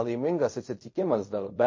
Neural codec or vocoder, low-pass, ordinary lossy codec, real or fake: codec, 16 kHz in and 24 kHz out, 0.4 kbps, LongCat-Audio-Codec, fine tuned four codebook decoder; 7.2 kHz; MP3, 32 kbps; fake